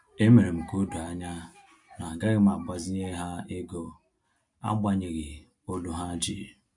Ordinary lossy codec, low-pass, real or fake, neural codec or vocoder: MP3, 64 kbps; 10.8 kHz; real; none